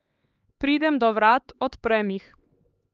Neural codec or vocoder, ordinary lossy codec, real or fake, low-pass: codec, 16 kHz, 4 kbps, X-Codec, HuBERT features, trained on LibriSpeech; Opus, 24 kbps; fake; 7.2 kHz